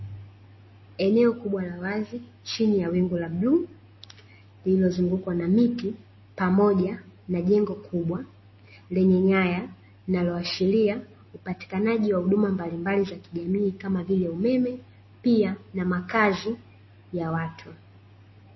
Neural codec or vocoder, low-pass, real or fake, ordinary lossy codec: none; 7.2 kHz; real; MP3, 24 kbps